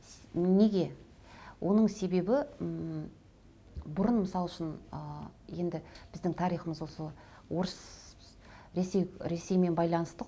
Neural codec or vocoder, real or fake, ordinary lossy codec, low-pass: none; real; none; none